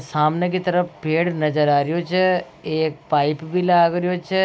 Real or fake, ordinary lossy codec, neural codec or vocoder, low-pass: real; none; none; none